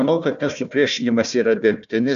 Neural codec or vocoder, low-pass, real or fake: codec, 16 kHz, 1 kbps, FunCodec, trained on Chinese and English, 50 frames a second; 7.2 kHz; fake